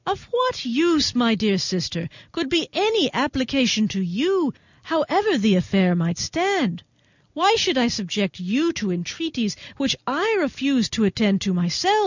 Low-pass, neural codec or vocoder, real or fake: 7.2 kHz; none; real